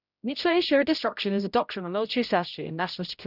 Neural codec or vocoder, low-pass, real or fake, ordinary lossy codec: codec, 16 kHz, 0.5 kbps, X-Codec, HuBERT features, trained on general audio; 5.4 kHz; fake; none